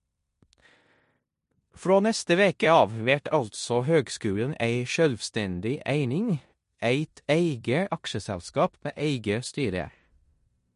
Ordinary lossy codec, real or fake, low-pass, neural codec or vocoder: MP3, 48 kbps; fake; 10.8 kHz; codec, 16 kHz in and 24 kHz out, 0.9 kbps, LongCat-Audio-Codec, four codebook decoder